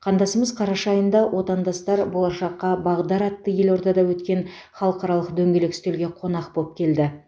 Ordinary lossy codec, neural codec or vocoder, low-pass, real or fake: none; none; none; real